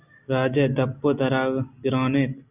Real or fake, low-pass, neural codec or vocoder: real; 3.6 kHz; none